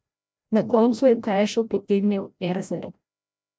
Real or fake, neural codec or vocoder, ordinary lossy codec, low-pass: fake; codec, 16 kHz, 0.5 kbps, FreqCodec, larger model; none; none